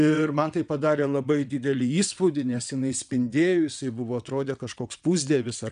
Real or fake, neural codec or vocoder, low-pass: fake; vocoder, 22.05 kHz, 80 mel bands, WaveNeXt; 9.9 kHz